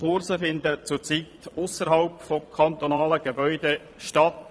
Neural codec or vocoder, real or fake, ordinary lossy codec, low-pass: vocoder, 22.05 kHz, 80 mel bands, Vocos; fake; none; none